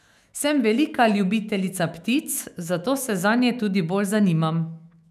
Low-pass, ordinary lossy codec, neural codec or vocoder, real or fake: 14.4 kHz; none; autoencoder, 48 kHz, 128 numbers a frame, DAC-VAE, trained on Japanese speech; fake